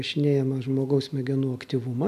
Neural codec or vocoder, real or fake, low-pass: none; real; 14.4 kHz